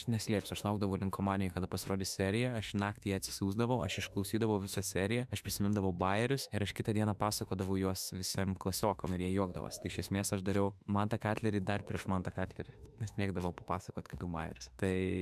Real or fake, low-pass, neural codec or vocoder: fake; 14.4 kHz; autoencoder, 48 kHz, 32 numbers a frame, DAC-VAE, trained on Japanese speech